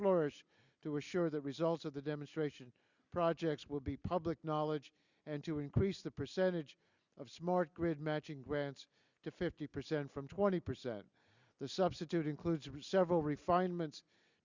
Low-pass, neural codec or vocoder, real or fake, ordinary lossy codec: 7.2 kHz; none; real; Opus, 64 kbps